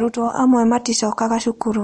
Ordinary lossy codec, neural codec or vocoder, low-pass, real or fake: MP3, 48 kbps; none; 19.8 kHz; real